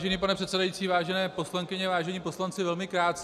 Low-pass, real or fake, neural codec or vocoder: 14.4 kHz; real; none